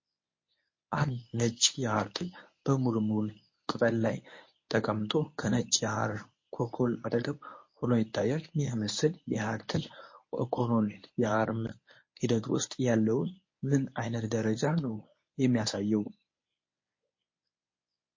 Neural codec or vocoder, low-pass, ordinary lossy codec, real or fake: codec, 24 kHz, 0.9 kbps, WavTokenizer, medium speech release version 1; 7.2 kHz; MP3, 32 kbps; fake